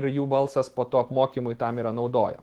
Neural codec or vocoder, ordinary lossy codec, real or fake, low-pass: autoencoder, 48 kHz, 128 numbers a frame, DAC-VAE, trained on Japanese speech; Opus, 16 kbps; fake; 19.8 kHz